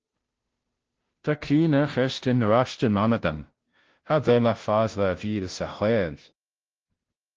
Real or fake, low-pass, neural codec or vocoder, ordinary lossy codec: fake; 7.2 kHz; codec, 16 kHz, 0.5 kbps, FunCodec, trained on Chinese and English, 25 frames a second; Opus, 16 kbps